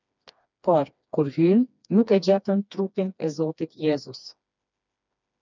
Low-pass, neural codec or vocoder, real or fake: 7.2 kHz; codec, 16 kHz, 2 kbps, FreqCodec, smaller model; fake